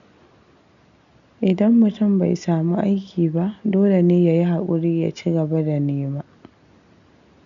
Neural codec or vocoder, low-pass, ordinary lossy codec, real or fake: none; 7.2 kHz; none; real